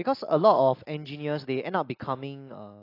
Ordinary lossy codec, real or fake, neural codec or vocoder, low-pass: AAC, 32 kbps; real; none; 5.4 kHz